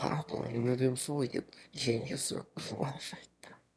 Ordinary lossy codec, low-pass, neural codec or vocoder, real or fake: none; none; autoencoder, 22.05 kHz, a latent of 192 numbers a frame, VITS, trained on one speaker; fake